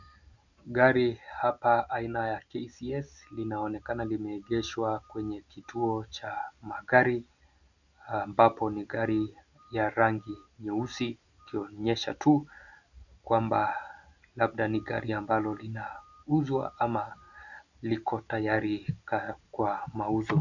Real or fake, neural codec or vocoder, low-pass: real; none; 7.2 kHz